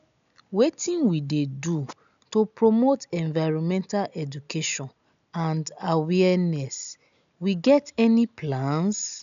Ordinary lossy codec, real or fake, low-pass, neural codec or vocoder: none; real; 7.2 kHz; none